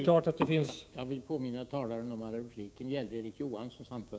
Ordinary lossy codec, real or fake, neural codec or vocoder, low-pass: none; fake; codec, 16 kHz, 6 kbps, DAC; none